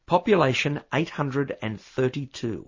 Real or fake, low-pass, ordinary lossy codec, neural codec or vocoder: real; 7.2 kHz; MP3, 32 kbps; none